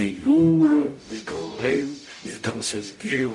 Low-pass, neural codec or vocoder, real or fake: 10.8 kHz; codec, 44.1 kHz, 0.9 kbps, DAC; fake